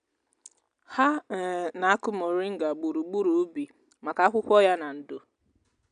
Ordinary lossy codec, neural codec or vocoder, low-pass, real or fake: none; none; 9.9 kHz; real